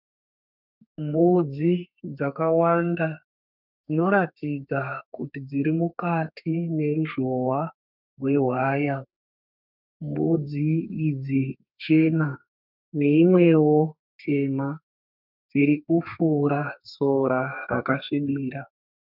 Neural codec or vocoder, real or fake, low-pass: codec, 32 kHz, 1.9 kbps, SNAC; fake; 5.4 kHz